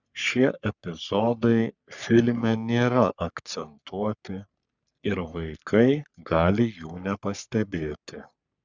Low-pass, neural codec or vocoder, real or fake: 7.2 kHz; codec, 44.1 kHz, 3.4 kbps, Pupu-Codec; fake